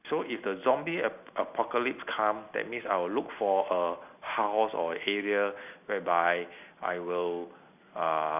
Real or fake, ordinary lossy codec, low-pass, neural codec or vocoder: real; none; 3.6 kHz; none